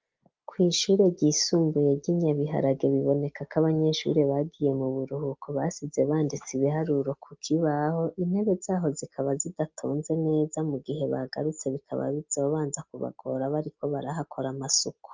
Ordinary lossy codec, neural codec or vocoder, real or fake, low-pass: Opus, 32 kbps; none; real; 7.2 kHz